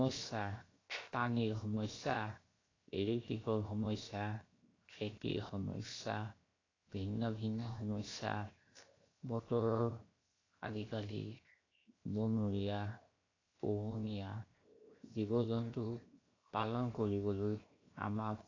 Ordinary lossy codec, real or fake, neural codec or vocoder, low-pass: AAC, 32 kbps; fake; codec, 16 kHz, 0.7 kbps, FocalCodec; 7.2 kHz